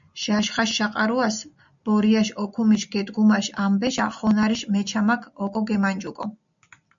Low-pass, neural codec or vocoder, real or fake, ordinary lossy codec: 7.2 kHz; none; real; MP3, 64 kbps